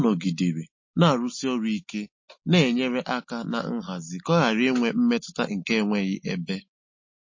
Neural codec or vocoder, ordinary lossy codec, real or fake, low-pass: none; MP3, 32 kbps; real; 7.2 kHz